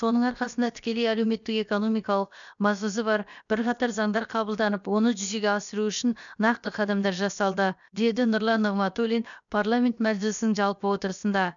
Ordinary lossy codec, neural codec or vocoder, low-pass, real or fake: none; codec, 16 kHz, about 1 kbps, DyCAST, with the encoder's durations; 7.2 kHz; fake